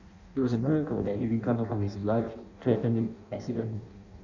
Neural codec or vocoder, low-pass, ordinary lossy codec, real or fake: codec, 16 kHz in and 24 kHz out, 0.6 kbps, FireRedTTS-2 codec; 7.2 kHz; none; fake